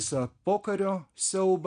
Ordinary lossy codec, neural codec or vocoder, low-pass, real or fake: MP3, 64 kbps; none; 9.9 kHz; real